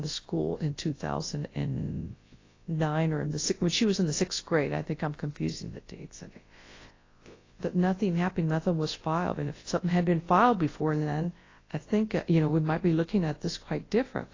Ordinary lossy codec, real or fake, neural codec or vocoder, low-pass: AAC, 32 kbps; fake; codec, 24 kHz, 0.9 kbps, WavTokenizer, large speech release; 7.2 kHz